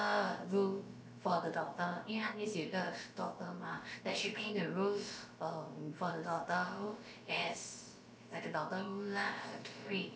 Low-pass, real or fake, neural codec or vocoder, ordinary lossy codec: none; fake; codec, 16 kHz, about 1 kbps, DyCAST, with the encoder's durations; none